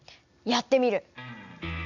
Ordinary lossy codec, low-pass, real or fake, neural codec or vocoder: none; 7.2 kHz; real; none